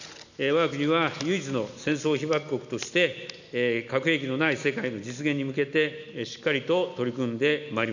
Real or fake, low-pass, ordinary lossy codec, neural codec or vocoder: real; 7.2 kHz; none; none